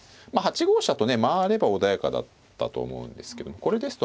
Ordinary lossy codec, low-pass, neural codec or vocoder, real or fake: none; none; none; real